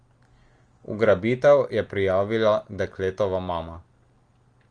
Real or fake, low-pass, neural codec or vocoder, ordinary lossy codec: real; 9.9 kHz; none; Opus, 24 kbps